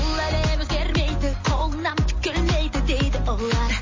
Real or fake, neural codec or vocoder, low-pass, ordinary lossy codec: real; none; 7.2 kHz; MP3, 32 kbps